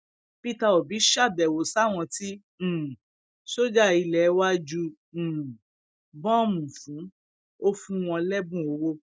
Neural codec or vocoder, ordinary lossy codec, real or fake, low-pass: none; none; real; none